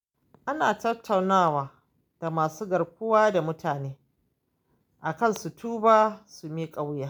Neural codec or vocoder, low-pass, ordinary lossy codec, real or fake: none; none; none; real